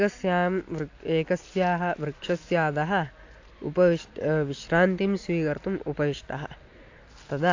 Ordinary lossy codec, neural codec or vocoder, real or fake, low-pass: AAC, 48 kbps; none; real; 7.2 kHz